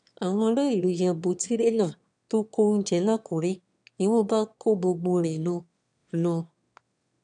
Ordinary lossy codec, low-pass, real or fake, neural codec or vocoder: none; 9.9 kHz; fake; autoencoder, 22.05 kHz, a latent of 192 numbers a frame, VITS, trained on one speaker